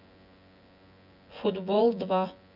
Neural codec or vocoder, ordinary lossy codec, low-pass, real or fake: vocoder, 24 kHz, 100 mel bands, Vocos; none; 5.4 kHz; fake